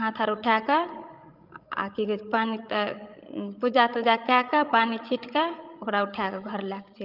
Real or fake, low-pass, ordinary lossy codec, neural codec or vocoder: fake; 5.4 kHz; Opus, 32 kbps; codec, 16 kHz, 16 kbps, FreqCodec, larger model